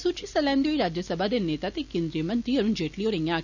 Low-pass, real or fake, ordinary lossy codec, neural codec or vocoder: 7.2 kHz; real; none; none